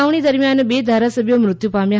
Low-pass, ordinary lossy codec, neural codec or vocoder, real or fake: none; none; none; real